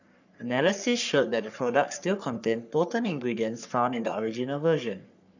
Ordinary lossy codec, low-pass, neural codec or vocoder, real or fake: none; 7.2 kHz; codec, 44.1 kHz, 3.4 kbps, Pupu-Codec; fake